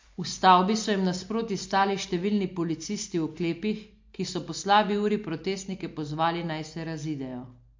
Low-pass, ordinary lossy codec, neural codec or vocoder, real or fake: 7.2 kHz; MP3, 48 kbps; none; real